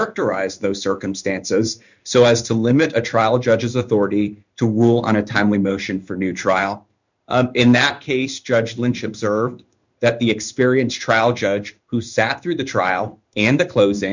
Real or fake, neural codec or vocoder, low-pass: fake; codec, 16 kHz in and 24 kHz out, 1 kbps, XY-Tokenizer; 7.2 kHz